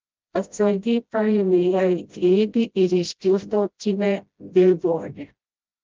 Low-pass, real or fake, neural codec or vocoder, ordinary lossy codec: 7.2 kHz; fake; codec, 16 kHz, 0.5 kbps, FreqCodec, smaller model; Opus, 24 kbps